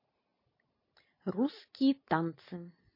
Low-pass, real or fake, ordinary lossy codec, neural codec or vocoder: 5.4 kHz; real; MP3, 24 kbps; none